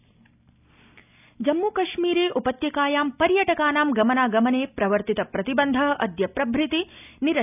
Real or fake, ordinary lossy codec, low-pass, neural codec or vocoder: real; none; 3.6 kHz; none